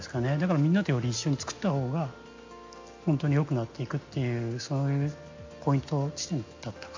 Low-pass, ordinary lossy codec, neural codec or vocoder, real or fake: 7.2 kHz; MP3, 48 kbps; none; real